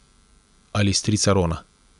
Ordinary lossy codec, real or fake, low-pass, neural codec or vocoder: none; real; 10.8 kHz; none